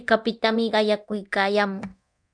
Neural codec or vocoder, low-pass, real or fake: codec, 24 kHz, 0.9 kbps, DualCodec; 9.9 kHz; fake